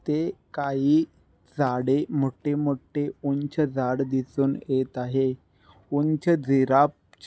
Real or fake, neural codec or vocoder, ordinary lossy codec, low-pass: real; none; none; none